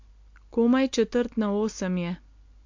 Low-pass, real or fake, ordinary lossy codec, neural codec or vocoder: 7.2 kHz; real; MP3, 48 kbps; none